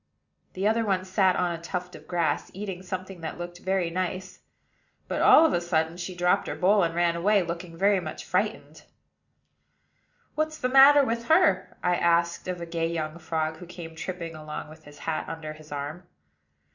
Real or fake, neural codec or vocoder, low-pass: real; none; 7.2 kHz